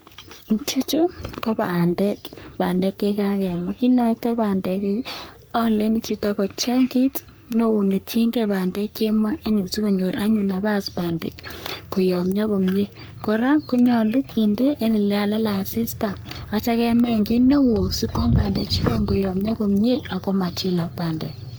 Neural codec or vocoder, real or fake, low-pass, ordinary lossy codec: codec, 44.1 kHz, 3.4 kbps, Pupu-Codec; fake; none; none